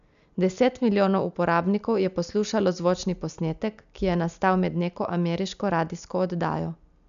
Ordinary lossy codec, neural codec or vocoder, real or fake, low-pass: none; none; real; 7.2 kHz